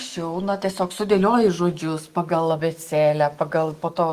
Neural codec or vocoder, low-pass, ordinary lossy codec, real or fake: autoencoder, 48 kHz, 128 numbers a frame, DAC-VAE, trained on Japanese speech; 14.4 kHz; Opus, 24 kbps; fake